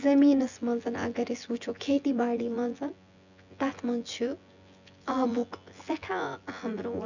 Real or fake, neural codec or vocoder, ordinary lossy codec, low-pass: fake; vocoder, 24 kHz, 100 mel bands, Vocos; none; 7.2 kHz